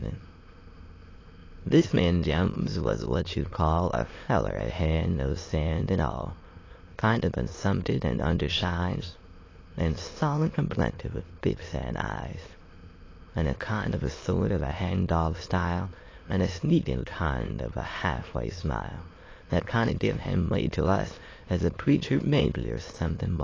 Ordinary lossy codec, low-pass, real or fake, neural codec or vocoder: AAC, 32 kbps; 7.2 kHz; fake; autoencoder, 22.05 kHz, a latent of 192 numbers a frame, VITS, trained on many speakers